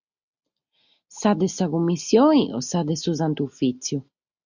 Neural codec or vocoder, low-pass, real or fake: none; 7.2 kHz; real